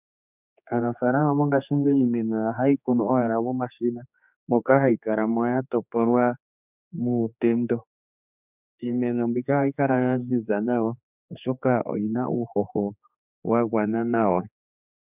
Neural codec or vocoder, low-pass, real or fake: codec, 16 kHz, 4 kbps, X-Codec, HuBERT features, trained on general audio; 3.6 kHz; fake